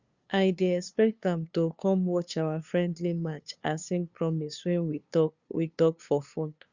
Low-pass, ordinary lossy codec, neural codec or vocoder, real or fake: 7.2 kHz; Opus, 64 kbps; codec, 16 kHz, 2 kbps, FunCodec, trained on LibriTTS, 25 frames a second; fake